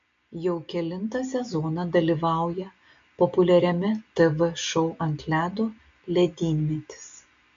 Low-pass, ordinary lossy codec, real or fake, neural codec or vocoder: 7.2 kHz; AAC, 64 kbps; real; none